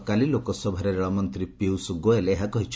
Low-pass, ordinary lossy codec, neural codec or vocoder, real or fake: none; none; none; real